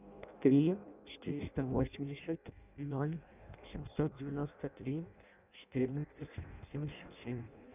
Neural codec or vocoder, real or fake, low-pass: codec, 16 kHz in and 24 kHz out, 0.6 kbps, FireRedTTS-2 codec; fake; 3.6 kHz